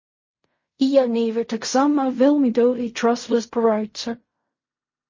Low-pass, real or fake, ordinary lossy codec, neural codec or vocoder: 7.2 kHz; fake; MP3, 32 kbps; codec, 16 kHz in and 24 kHz out, 0.4 kbps, LongCat-Audio-Codec, fine tuned four codebook decoder